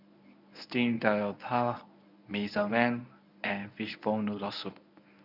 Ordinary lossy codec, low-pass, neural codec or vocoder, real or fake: none; 5.4 kHz; codec, 24 kHz, 0.9 kbps, WavTokenizer, medium speech release version 1; fake